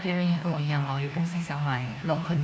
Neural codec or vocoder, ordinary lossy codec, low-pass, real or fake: codec, 16 kHz, 1 kbps, FunCodec, trained on LibriTTS, 50 frames a second; none; none; fake